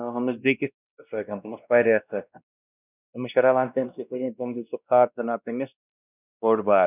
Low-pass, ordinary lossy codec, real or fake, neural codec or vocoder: 3.6 kHz; none; fake; codec, 16 kHz, 1 kbps, X-Codec, WavLM features, trained on Multilingual LibriSpeech